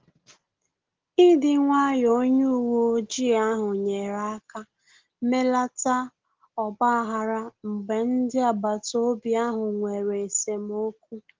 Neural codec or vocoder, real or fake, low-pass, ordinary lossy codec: none; real; 7.2 kHz; Opus, 16 kbps